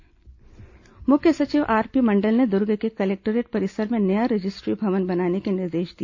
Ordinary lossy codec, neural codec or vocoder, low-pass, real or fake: AAC, 48 kbps; none; 7.2 kHz; real